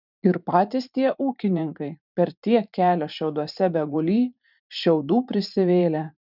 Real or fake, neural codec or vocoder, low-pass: fake; vocoder, 44.1 kHz, 128 mel bands every 512 samples, BigVGAN v2; 5.4 kHz